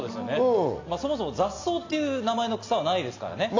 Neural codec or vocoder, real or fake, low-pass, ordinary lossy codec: none; real; 7.2 kHz; AAC, 48 kbps